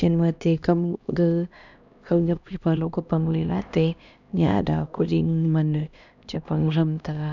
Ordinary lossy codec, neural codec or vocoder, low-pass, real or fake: none; codec, 16 kHz, 1 kbps, X-Codec, HuBERT features, trained on LibriSpeech; 7.2 kHz; fake